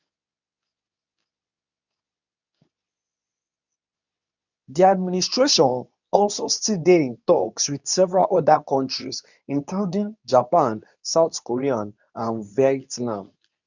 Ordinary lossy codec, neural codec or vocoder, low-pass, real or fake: none; codec, 24 kHz, 0.9 kbps, WavTokenizer, medium speech release version 2; 7.2 kHz; fake